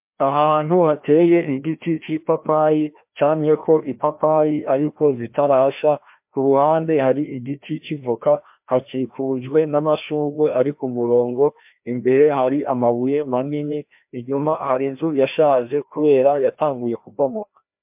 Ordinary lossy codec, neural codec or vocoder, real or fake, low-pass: MP3, 32 kbps; codec, 16 kHz, 1 kbps, FreqCodec, larger model; fake; 3.6 kHz